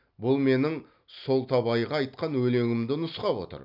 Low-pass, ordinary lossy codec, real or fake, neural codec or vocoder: 5.4 kHz; none; real; none